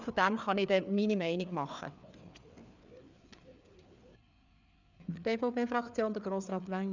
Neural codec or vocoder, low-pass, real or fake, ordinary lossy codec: codec, 16 kHz, 4 kbps, FreqCodec, larger model; 7.2 kHz; fake; none